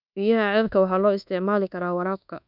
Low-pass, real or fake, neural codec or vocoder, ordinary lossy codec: 5.4 kHz; fake; codec, 24 kHz, 1.2 kbps, DualCodec; none